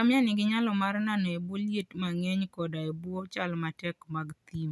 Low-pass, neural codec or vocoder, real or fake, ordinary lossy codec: none; none; real; none